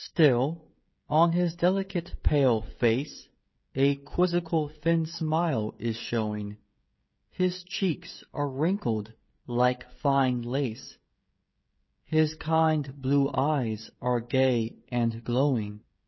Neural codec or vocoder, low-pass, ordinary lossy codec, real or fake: codec, 16 kHz, 16 kbps, FreqCodec, smaller model; 7.2 kHz; MP3, 24 kbps; fake